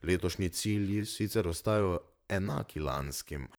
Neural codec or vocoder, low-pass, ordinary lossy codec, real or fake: vocoder, 44.1 kHz, 128 mel bands, Pupu-Vocoder; none; none; fake